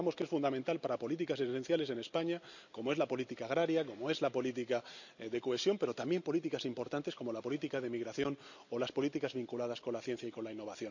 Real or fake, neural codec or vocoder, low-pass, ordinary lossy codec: real; none; 7.2 kHz; none